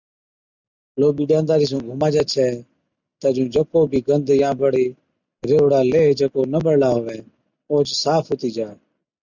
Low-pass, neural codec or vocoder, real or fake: 7.2 kHz; none; real